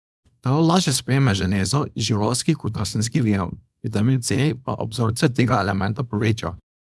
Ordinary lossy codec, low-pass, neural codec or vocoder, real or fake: none; none; codec, 24 kHz, 0.9 kbps, WavTokenizer, small release; fake